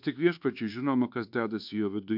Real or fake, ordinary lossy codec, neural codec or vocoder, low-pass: fake; MP3, 48 kbps; codec, 24 kHz, 1.2 kbps, DualCodec; 5.4 kHz